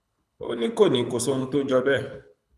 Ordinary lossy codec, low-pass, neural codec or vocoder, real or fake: none; none; codec, 24 kHz, 6 kbps, HILCodec; fake